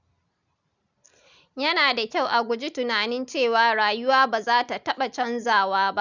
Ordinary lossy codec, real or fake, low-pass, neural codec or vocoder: none; real; 7.2 kHz; none